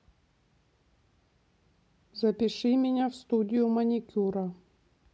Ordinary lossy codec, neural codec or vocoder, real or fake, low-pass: none; none; real; none